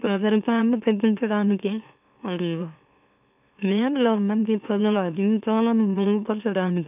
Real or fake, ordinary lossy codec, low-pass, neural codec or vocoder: fake; none; 3.6 kHz; autoencoder, 44.1 kHz, a latent of 192 numbers a frame, MeloTTS